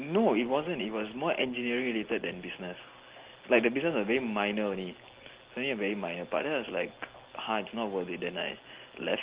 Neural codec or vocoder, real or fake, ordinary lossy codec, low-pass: none; real; Opus, 16 kbps; 3.6 kHz